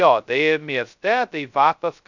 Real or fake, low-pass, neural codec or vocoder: fake; 7.2 kHz; codec, 16 kHz, 0.2 kbps, FocalCodec